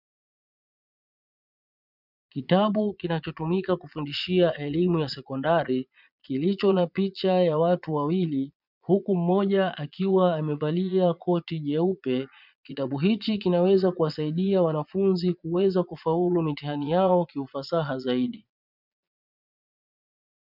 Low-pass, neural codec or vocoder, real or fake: 5.4 kHz; vocoder, 22.05 kHz, 80 mel bands, Vocos; fake